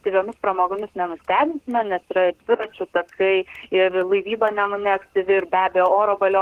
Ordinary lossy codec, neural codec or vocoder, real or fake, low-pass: Opus, 16 kbps; codec, 44.1 kHz, 7.8 kbps, Pupu-Codec; fake; 14.4 kHz